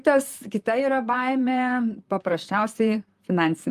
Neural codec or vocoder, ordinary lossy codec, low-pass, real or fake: vocoder, 44.1 kHz, 128 mel bands, Pupu-Vocoder; Opus, 32 kbps; 14.4 kHz; fake